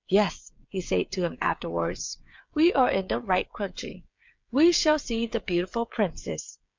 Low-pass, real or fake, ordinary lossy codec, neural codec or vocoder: 7.2 kHz; fake; MP3, 64 kbps; codec, 16 kHz, 16 kbps, FreqCodec, smaller model